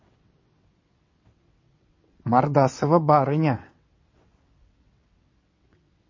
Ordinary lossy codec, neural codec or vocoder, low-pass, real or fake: MP3, 32 kbps; vocoder, 22.05 kHz, 80 mel bands, WaveNeXt; 7.2 kHz; fake